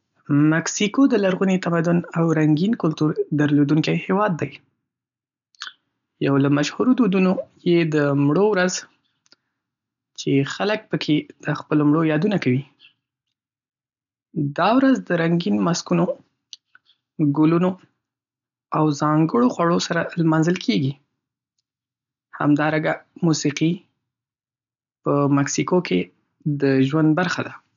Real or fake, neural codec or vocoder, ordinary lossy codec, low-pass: real; none; none; 7.2 kHz